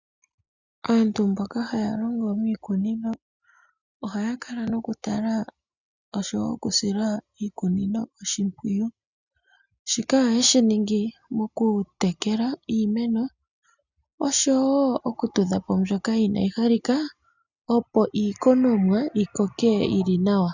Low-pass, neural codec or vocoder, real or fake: 7.2 kHz; none; real